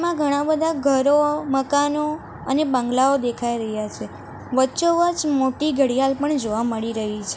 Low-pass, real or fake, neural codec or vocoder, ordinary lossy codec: none; real; none; none